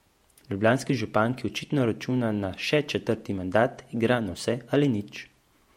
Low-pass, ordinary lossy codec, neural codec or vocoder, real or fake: 19.8 kHz; MP3, 64 kbps; vocoder, 48 kHz, 128 mel bands, Vocos; fake